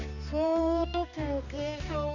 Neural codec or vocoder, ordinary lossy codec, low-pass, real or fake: codec, 16 kHz, 2 kbps, X-Codec, HuBERT features, trained on balanced general audio; none; 7.2 kHz; fake